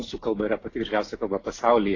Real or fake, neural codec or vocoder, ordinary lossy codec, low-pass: real; none; AAC, 32 kbps; 7.2 kHz